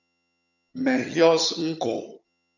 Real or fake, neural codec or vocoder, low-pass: fake; vocoder, 22.05 kHz, 80 mel bands, HiFi-GAN; 7.2 kHz